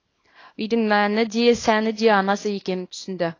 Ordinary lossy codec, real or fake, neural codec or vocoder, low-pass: AAC, 32 kbps; fake; codec, 24 kHz, 0.9 kbps, WavTokenizer, small release; 7.2 kHz